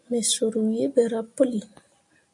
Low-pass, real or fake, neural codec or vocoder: 10.8 kHz; real; none